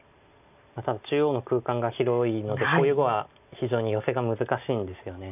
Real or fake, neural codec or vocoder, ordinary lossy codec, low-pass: real; none; none; 3.6 kHz